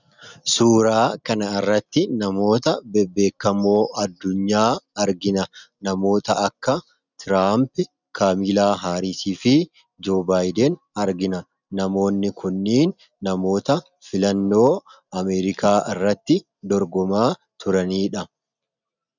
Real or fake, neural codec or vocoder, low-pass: real; none; 7.2 kHz